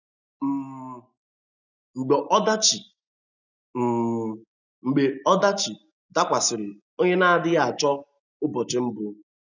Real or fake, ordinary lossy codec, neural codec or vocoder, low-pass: real; none; none; 7.2 kHz